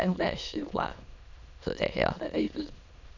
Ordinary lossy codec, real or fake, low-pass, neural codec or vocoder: none; fake; 7.2 kHz; autoencoder, 22.05 kHz, a latent of 192 numbers a frame, VITS, trained on many speakers